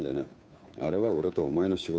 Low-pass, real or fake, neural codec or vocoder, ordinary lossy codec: none; fake; codec, 16 kHz, 2 kbps, FunCodec, trained on Chinese and English, 25 frames a second; none